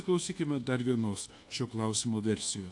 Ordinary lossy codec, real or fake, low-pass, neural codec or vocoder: AAC, 48 kbps; fake; 10.8 kHz; codec, 24 kHz, 1.2 kbps, DualCodec